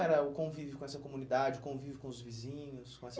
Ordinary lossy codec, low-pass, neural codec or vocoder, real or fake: none; none; none; real